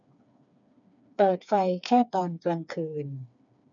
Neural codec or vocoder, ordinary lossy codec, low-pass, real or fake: codec, 16 kHz, 4 kbps, FreqCodec, smaller model; none; 7.2 kHz; fake